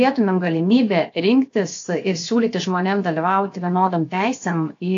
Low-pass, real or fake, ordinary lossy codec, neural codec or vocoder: 7.2 kHz; fake; AAC, 48 kbps; codec, 16 kHz, 0.7 kbps, FocalCodec